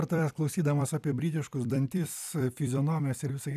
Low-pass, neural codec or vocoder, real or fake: 14.4 kHz; vocoder, 44.1 kHz, 128 mel bands every 256 samples, BigVGAN v2; fake